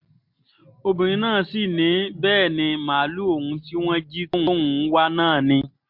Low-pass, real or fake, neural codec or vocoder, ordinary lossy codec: 5.4 kHz; real; none; MP3, 48 kbps